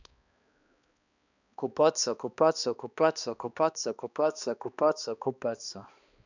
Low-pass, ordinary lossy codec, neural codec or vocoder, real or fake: 7.2 kHz; none; codec, 16 kHz, 2 kbps, X-Codec, HuBERT features, trained on balanced general audio; fake